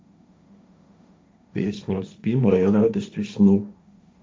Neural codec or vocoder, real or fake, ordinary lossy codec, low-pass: codec, 16 kHz, 1.1 kbps, Voila-Tokenizer; fake; none; none